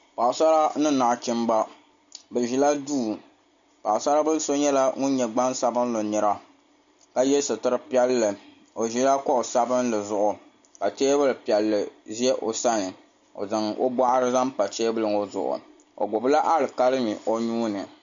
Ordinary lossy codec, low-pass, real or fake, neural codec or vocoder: MP3, 64 kbps; 7.2 kHz; real; none